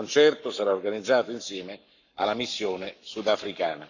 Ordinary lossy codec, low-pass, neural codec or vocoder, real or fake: none; 7.2 kHz; codec, 44.1 kHz, 7.8 kbps, Pupu-Codec; fake